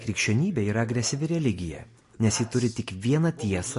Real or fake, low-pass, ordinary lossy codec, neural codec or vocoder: real; 10.8 kHz; MP3, 48 kbps; none